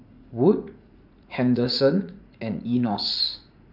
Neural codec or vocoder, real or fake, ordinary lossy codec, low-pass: vocoder, 44.1 kHz, 80 mel bands, Vocos; fake; none; 5.4 kHz